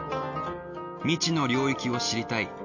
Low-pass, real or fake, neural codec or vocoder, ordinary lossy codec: 7.2 kHz; real; none; none